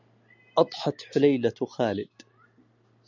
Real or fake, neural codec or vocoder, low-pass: real; none; 7.2 kHz